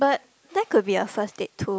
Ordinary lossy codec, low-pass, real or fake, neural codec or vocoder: none; none; real; none